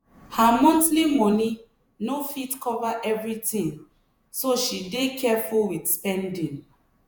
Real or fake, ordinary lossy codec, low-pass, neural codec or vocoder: fake; none; none; vocoder, 48 kHz, 128 mel bands, Vocos